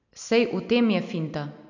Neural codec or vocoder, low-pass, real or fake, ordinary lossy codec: none; 7.2 kHz; real; none